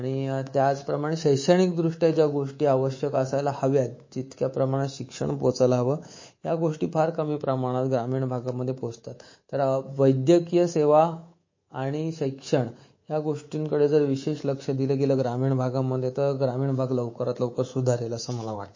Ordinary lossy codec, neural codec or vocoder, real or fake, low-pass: MP3, 32 kbps; codec, 24 kHz, 3.1 kbps, DualCodec; fake; 7.2 kHz